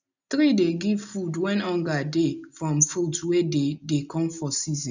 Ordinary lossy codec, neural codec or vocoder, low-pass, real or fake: none; none; 7.2 kHz; real